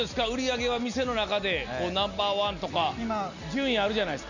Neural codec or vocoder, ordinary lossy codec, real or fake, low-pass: none; none; real; 7.2 kHz